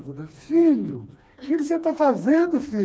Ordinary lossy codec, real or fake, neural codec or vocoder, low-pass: none; fake; codec, 16 kHz, 2 kbps, FreqCodec, smaller model; none